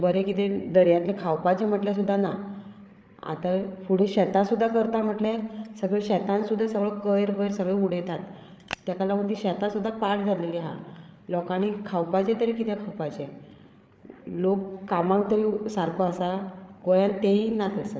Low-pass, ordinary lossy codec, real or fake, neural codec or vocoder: none; none; fake; codec, 16 kHz, 8 kbps, FreqCodec, larger model